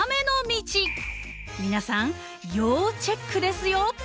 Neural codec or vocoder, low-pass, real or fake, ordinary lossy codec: none; none; real; none